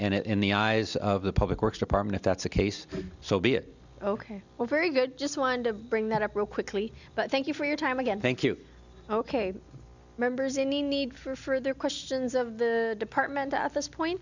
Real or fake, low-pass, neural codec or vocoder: real; 7.2 kHz; none